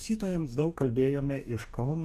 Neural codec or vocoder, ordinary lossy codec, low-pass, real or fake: codec, 44.1 kHz, 2.6 kbps, DAC; AAC, 96 kbps; 14.4 kHz; fake